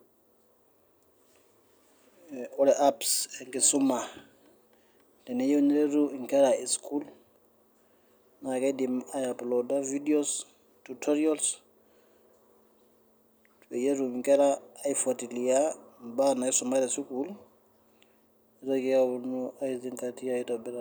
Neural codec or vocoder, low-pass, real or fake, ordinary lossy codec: none; none; real; none